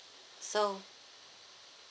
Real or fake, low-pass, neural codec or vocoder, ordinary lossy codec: real; none; none; none